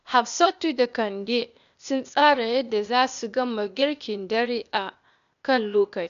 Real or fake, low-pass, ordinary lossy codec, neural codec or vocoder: fake; 7.2 kHz; none; codec, 16 kHz, 0.8 kbps, ZipCodec